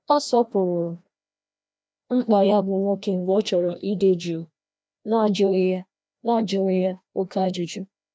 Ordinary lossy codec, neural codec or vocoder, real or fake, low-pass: none; codec, 16 kHz, 1 kbps, FreqCodec, larger model; fake; none